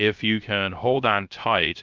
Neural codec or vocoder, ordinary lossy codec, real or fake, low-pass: codec, 16 kHz, 0.3 kbps, FocalCodec; Opus, 32 kbps; fake; 7.2 kHz